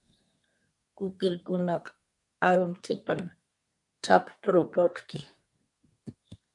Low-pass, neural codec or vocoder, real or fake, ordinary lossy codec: 10.8 kHz; codec, 24 kHz, 1 kbps, SNAC; fake; MP3, 64 kbps